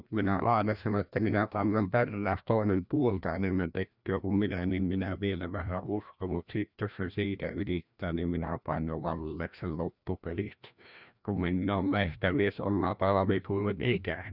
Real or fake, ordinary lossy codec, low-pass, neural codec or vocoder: fake; none; 5.4 kHz; codec, 16 kHz, 1 kbps, FreqCodec, larger model